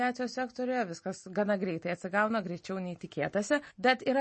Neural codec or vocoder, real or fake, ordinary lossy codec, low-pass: none; real; MP3, 32 kbps; 10.8 kHz